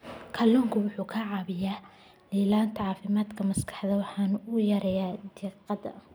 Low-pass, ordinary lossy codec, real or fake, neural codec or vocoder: none; none; real; none